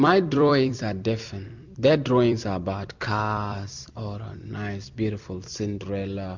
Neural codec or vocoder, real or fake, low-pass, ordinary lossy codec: vocoder, 44.1 kHz, 128 mel bands every 256 samples, BigVGAN v2; fake; 7.2 kHz; MP3, 64 kbps